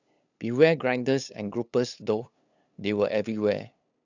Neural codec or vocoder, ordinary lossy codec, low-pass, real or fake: codec, 16 kHz, 8 kbps, FunCodec, trained on LibriTTS, 25 frames a second; none; 7.2 kHz; fake